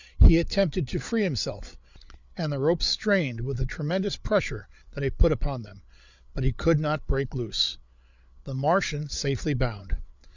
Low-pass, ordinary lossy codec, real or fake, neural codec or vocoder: 7.2 kHz; Opus, 64 kbps; fake; codec, 16 kHz, 8 kbps, FreqCodec, larger model